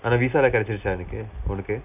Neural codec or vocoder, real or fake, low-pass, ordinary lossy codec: none; real; 3.6 kHz; AAC, 32 kbps